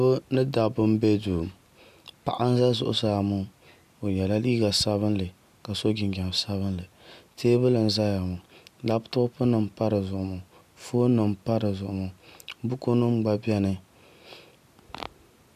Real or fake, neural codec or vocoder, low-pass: real; none; 14.4 kHz